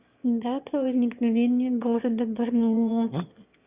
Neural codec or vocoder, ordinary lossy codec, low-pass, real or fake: autoencoder, 22.05 kHz, a latent of 192 numbers a frame, VITS, trained on one speaker; Opus, 64 kbps; 3.6 kHz; fake